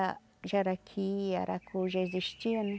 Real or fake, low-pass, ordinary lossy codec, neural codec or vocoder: real; none; none; none